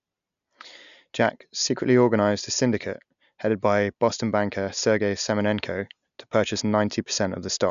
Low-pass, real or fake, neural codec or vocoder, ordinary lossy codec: 7.2 kHz; real; none; none